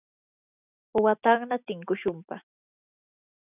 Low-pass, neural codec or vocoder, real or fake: 3.6 kHz; none; real